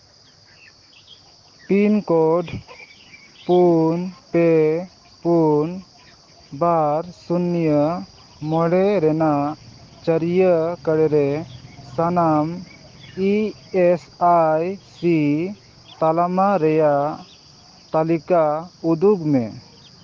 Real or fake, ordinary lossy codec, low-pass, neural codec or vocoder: real; Opus, 16 kbps; 7.2 kHz; none